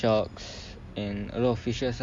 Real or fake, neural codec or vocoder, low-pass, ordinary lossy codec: real; none; none; none